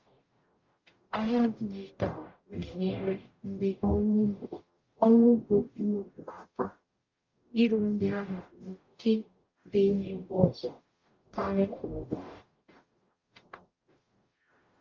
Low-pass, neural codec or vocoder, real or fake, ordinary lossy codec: 7.2 kHz; codec, 44.1 kHz, 0.9 kbps, DAC; fake; Opus, 24 kbps